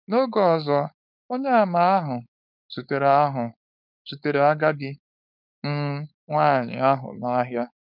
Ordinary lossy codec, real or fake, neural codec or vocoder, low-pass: none; fake; codec, 16 kHz, 4.8 kbps, FACodec; 5.4 kHz